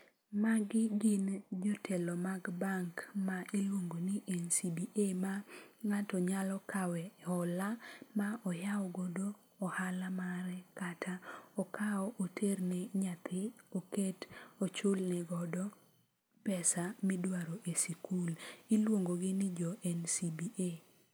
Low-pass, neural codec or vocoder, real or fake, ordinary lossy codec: none; none; real; none